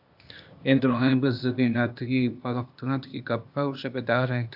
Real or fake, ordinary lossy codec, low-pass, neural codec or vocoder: fake; AAC, 48 kbps; 5.4 kHz; codec, 16 kHz, 0.8 kbps, ZipCodec